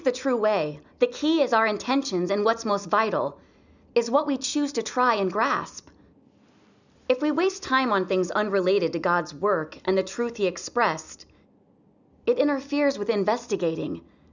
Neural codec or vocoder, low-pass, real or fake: none; 7.2 kHz; real